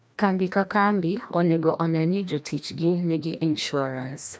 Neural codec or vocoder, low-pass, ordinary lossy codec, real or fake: codec, 16 kHz, 1 kbps, FreqCodec, larger model; none; none; fake